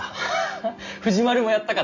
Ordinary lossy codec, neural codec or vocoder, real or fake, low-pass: none; none; real; 7.2 kHz